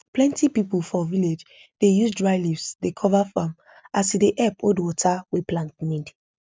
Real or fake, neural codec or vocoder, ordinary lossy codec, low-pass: real; none; none; none